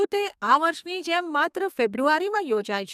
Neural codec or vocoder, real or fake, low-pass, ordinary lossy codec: codec, 32 kHz, 1.9 kbps, SNAC; fake; 14.4 kHz; none